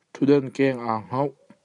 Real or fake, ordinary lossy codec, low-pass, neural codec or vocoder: real; MP3, 96 kbps; 10.8 kHz; none